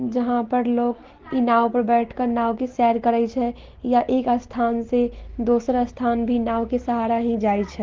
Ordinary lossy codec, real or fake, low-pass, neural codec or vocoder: Opus, 16 kbps; real; 7.2 kHz; none